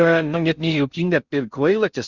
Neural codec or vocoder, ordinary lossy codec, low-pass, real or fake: codec, 16 kHz in and 24 kHz out, 0.6 kbps, FocalCodec, streaming, 4096 codes; Opus, 64 kbps; 7.2 kHz; fake